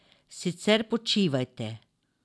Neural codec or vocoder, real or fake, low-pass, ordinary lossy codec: none; real; none; none